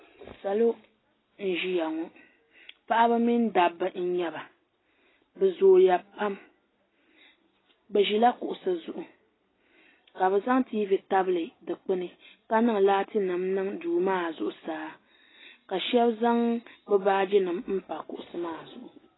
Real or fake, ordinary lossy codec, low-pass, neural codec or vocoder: real; AAC, 16 kbps; 7.2 kHz; none